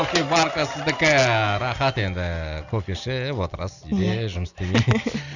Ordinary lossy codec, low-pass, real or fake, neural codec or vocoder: none; 7.2 kHz; real; none